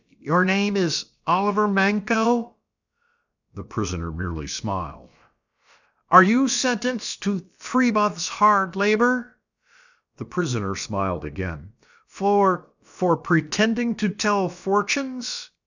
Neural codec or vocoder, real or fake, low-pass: codec, 16 kHz, about 1 kbps, DyCAST, with the encoder's durations; fake; 7.2 kHz